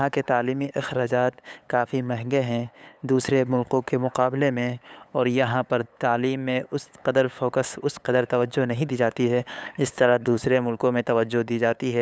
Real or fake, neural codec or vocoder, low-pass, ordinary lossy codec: fake; codec, 16 kHz, 8 kbps, FunCodec, trained on LibriTTS, 25 frames a second; none; none